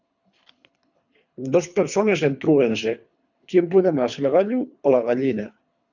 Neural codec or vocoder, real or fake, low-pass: codec, 24 kHz, 3 kbps, HILCodec; fake; 7.2 kHz